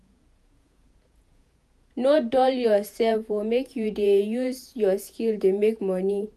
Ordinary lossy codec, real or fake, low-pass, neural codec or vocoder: none; fake; 14.4 kHz; vocoder, 48 kHz, 128 mel bands, Vocos